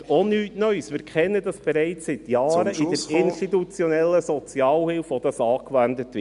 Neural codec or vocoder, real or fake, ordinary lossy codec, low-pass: none; real; none; 10.8 kHz